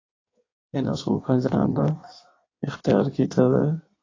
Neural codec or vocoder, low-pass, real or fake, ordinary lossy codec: codec, 16 kHz in and 24 kHz out, 1.1 kbps, FireRedTTS-2 codec; 7.2 kHz; fake; AAC, 32 kbps